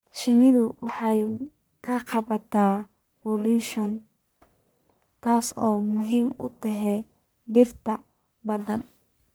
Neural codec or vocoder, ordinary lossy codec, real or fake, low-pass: codec, 44.1 kHz, 1.7 kbps, Pupu-Codec; none; fake; none